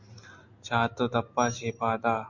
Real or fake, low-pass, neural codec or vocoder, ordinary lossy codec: real; 7.2 kHz; none; MP3, 64 kbps